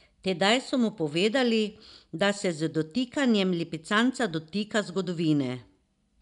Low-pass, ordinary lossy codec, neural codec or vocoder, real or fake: 10.8 kHz; none; none; real